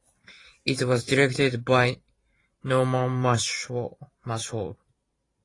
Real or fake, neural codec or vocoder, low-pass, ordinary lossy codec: fake; vocoder, 44.1 kHz, 128 mel bands every 512 samples, BigVGAN v2; 10.8 kHz; AAC, 32 kbps